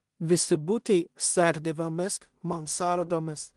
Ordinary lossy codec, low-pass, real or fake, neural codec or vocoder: Opus, 24 kbps; 10.8 kHz; fake; codec, 16 kHz in and 24 kHz out, 0.4 kbps, LongCat-Audio-Codec, two codebook decoder